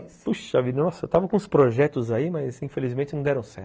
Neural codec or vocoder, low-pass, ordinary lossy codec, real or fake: none; none; none; real